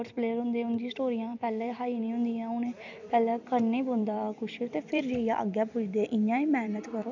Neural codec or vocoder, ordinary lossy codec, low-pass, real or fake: vocoder, 44.1 kHz, 128 mel bands every 256 samples, BigVGAN v2; none; 7.2 kHz; fake